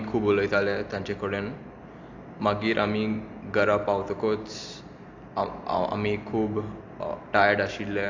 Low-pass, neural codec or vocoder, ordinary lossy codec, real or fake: 7.2 kHz; none; AAC, 48 kbps; real